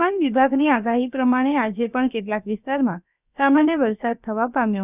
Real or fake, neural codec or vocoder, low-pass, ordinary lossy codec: fake; codec, 16 kHz, about 1 kbps, DyCAST, with the encoder's durations; 3.6 kHz; none